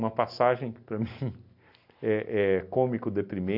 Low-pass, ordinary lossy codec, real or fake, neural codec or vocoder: 5.4 kHz; none; real; none